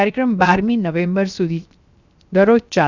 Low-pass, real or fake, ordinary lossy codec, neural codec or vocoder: 7.2 kHz; fake; Opus, 64 kbps; codec, 16 kHz, 0.7 kbps, FocalCodec